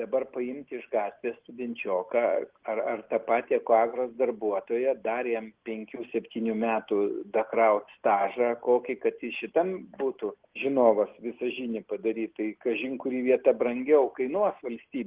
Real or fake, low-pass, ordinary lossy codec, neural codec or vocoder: real; 3.6 kHz; Opus, 16 kbps; none